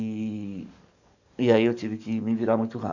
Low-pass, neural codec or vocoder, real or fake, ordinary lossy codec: 7.2 kHz; codec, 44.1 kHz, 7.8 kbps, DAC; fake; none